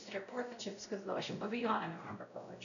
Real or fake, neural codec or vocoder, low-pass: fake; codec, 16 kHz, 0.5 kbps, X-Codec, WavLM features, trained on Multilingual LibriSpeech; 7.2 kHz